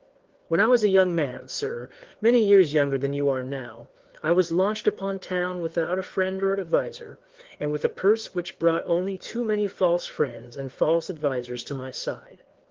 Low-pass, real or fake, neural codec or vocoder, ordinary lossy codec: 7.2 kHz; fake; codec, 16 kHz, 2 kbps, FreqCodec, larger model; Opus, 16 kbps